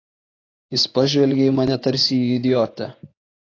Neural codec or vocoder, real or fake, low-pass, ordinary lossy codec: vocoder, 24 kHz, 100 mel bands, Vocos; fake; 7.2 kHz; AAC, 32 kbps